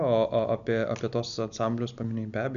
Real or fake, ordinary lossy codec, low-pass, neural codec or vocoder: real; MP3, 96 kbps; 7.2 kHz; none